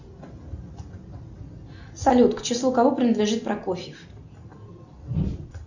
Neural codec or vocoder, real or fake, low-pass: none; real; 7.2 kHz